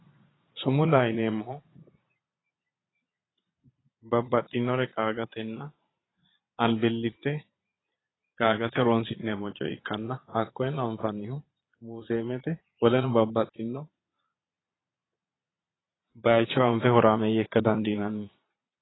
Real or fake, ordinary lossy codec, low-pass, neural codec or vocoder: fake; AAC, 16 kbps; 7.2 kHz; vocoder, 22.05 kHz, 80 mel bands, Vocos